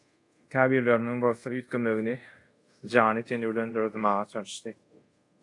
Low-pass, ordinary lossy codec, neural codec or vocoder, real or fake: 10.8 kHz; AAC, 48 kbps; codec, 24 kHz, 0.5 kbps, DualCodec; fake